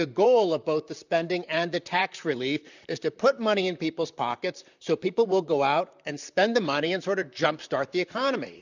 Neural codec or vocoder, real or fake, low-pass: vocoder, 44.1 kHz, 128 mel bands, Pupu-Vocoder; fake; 7.2 kHz